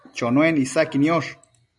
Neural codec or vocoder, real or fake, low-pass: none; real; 10.8 kHz